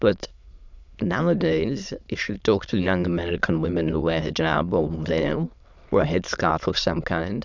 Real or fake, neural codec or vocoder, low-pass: fake; autoencoder, 22.05 kHz, a latent of 192 numbers a frame, VITS, trained on many speakers; 7.2 kHz